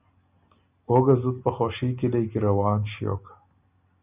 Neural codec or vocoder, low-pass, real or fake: none; 3.6 kHz; real